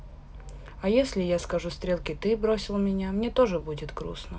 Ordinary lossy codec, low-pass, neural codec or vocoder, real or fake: none; none; none; real